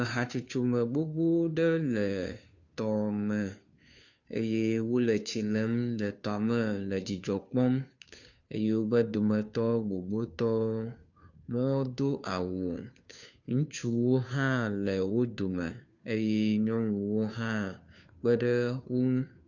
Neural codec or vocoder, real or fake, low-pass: codec, 16 kHz, 2 kbps, FunCodec, trained on Chinese and English, 25 frames a second; fake; 7.2 kHz